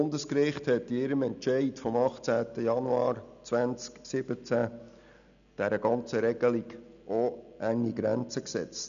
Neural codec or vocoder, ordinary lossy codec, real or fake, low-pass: none; none; real; 7.2 kHz